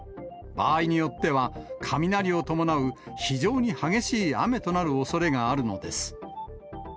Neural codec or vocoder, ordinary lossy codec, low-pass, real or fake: none; none; none; real